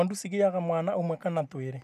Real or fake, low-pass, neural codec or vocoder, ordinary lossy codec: real; 14.4 kHz; none; none